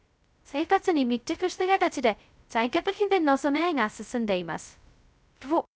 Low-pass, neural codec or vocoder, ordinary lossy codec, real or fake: none; codec, 16 kHz, 0.2 kbps, FocalCodec; none; fake